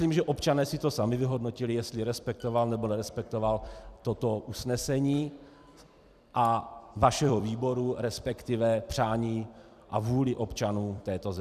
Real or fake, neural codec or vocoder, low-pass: fake; vocoder, 44.1 kHz, 128 mel bands every 256 samples, BigVGAN v2; 14.4 kHz